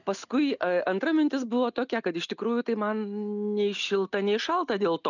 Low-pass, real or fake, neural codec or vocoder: 7.2 kHz; real; none